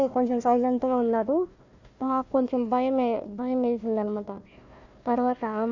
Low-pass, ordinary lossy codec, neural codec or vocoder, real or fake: 7.2 kHz; Opus, 64 kbps; codec, 16 kHz, 1 kbps, FunCodec, trained on Chinese and English, 50 frames a second; fake